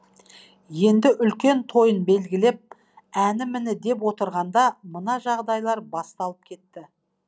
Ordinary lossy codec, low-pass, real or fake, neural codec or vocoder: none; none; real; none